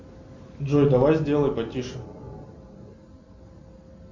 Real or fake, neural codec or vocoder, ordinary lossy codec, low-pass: real; none; MP3, 48 kbps; 7.2 kHz